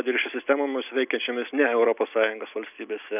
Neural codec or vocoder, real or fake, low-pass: none; real; 3.6 kHz